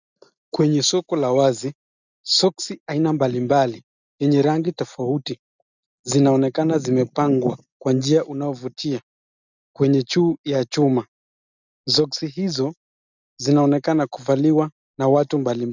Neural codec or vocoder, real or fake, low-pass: none; real; 7.2 kHz